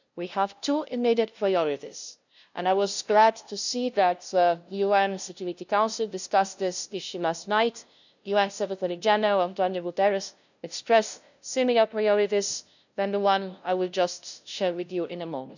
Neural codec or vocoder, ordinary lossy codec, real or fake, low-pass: codec, 16 kHz, 0.5 kbps, FunCodec, trained on LibriTTS, 25 frames a second; none; fake; 7.2 kHz